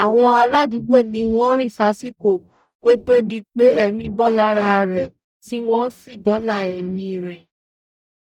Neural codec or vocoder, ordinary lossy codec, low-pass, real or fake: codec, 44.1 kHz, 0.9 kbps, DAC; none; 19.8 kHz; fake